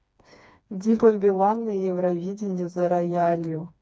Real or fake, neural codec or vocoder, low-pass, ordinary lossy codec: fake; codec, 16 kHz, 2 kbps, FreqCodec, smaller model; none; none